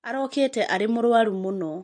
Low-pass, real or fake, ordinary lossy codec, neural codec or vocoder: 9.9 kHz; real; MP3, 48 kbps; none